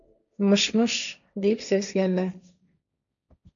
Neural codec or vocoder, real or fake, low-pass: codec, 16 kHz, 1.1 kbps, Voila-Tokenizer; fake; 7.2 kHz